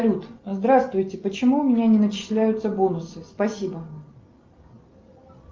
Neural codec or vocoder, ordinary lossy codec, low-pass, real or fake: none; Opus, 32 kbps; 7.2 kHz; real